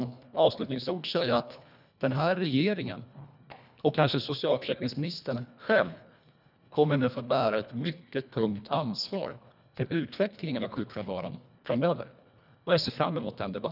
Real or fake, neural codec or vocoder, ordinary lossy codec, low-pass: fake; codec, 24 kHz, 1.5 kbps, HILCodec; none; 5.4 kHz